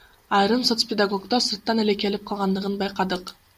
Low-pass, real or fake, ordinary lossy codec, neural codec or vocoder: 14.4 kHz; real; MP3, 96 kbps; none